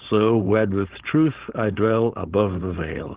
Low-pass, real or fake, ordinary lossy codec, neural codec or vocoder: 3.6 kHz; fake; Opus, 16 kbps; codec, 16 kHz, 4.8 kbps, FACodec